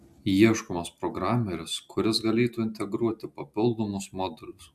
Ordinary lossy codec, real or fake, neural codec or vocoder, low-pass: AAC, 96 kbps; real; none; 14.4 kHz